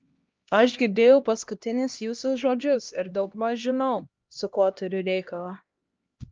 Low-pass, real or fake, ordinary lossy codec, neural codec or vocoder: 7.2 kHz; fake; Opus, 24 kbps; codec, 16 kHz, 1 kbps, X-Codec, HuBERT features, trained on LibriSpeech